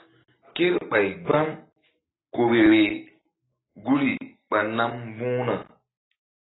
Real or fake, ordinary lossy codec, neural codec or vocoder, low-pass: real; AAC, 16 kbps; none; 7.2 kHz